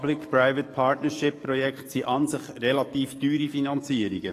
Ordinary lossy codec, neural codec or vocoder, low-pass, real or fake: AAC, 48 kbps; codec, 44.1 kHz, 7.8 kbps, DAC; 14.4 kHz; fake